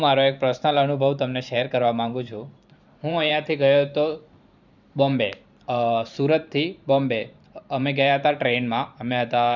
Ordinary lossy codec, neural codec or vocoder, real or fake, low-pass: none; vocoder, 44.1 kHz, 128 mel bands every 512 samples, BigVGAN v2; fake; 7.2 kHz